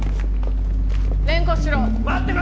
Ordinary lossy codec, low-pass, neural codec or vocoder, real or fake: none; none; none; real